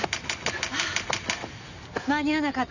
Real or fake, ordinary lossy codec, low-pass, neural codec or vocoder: real; none; 7.2 kHz; none